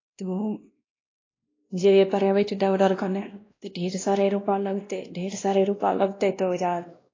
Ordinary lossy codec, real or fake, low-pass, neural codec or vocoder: AAC, 32 kbps; fake; 7.2 kHz; codec, 16 kHz, 1 kbps, X-Codec, WavLM features, trained on Multilingual LibriSpeech